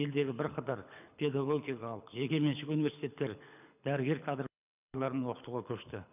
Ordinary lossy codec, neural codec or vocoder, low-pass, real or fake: none; codec, 24 kHz, 6 kbps, HILCodec; 3.6 kHz; fake